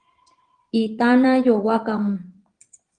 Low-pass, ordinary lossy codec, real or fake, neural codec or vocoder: 9.9 kHz; Opus, 24 kbps; fake; vocoder, 22.05 kHz, 80 mel bands, Vocos